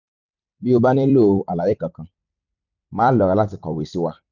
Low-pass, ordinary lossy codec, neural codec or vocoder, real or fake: 7.2 kHz; none; vocoder, 44.1 kHz, 128 mel bands every 256 samples, BigVGAN v2; fake